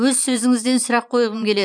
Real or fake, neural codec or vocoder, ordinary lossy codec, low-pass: fake; vocoder, 44.1 kHz, 128 mel bands every 512 samples, BigVGAN v2; none; 9.9 kHz